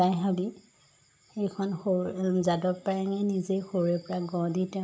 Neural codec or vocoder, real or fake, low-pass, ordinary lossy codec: none; real; none; none